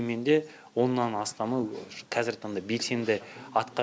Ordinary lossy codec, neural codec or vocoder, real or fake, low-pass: none; none; real; none